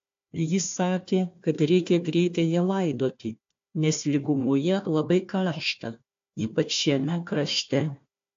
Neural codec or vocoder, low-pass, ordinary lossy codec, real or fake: codec, 16 kHz, 1 kbps, FunCodec, trained on Chinese and English, 50 frames a second; 7.2 kHz; AAC, 48 kbps; fake